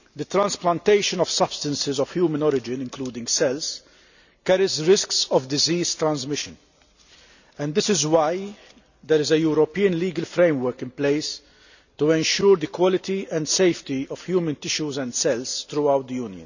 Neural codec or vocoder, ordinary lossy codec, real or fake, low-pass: none; none; real; 7.2 kHz